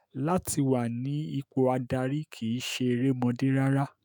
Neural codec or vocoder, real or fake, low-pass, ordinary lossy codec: autoencoder, 48 kHz, 128 numbers a frame, DAC-VAE, trained on Japanese speech; fake; none; none